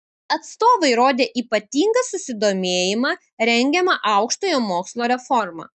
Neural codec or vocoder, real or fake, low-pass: none; real; 10.8 kHz